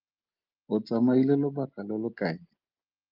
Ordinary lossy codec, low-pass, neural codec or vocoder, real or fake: Opus, 32 kbps; 5.4 kHz; none; real